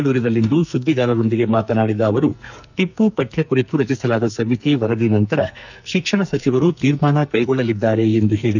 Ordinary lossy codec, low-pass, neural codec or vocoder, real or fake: none; 7.2 kHz; codec, 44.1 kHz, 2.6 kbps, SNAC; fake